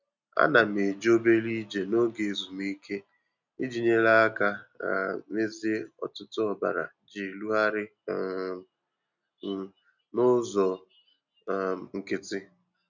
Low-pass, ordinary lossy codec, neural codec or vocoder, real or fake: 7.2 kHz; none; none; real